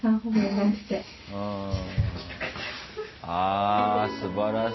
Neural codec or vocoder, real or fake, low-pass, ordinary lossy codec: none; real; 7.2 kHz; MP3, 24 kbps